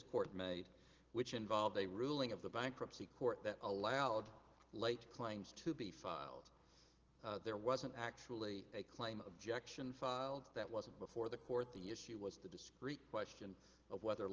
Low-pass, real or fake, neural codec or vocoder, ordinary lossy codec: 7.2 kHz; real; none; Opus, 16 kbps